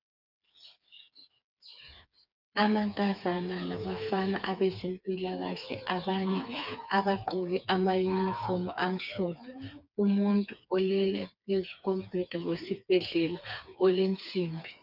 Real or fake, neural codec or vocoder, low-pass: fake; codec, 16 kHz, 4 kbps, FreqCodec, smaller model; 5.4 kHz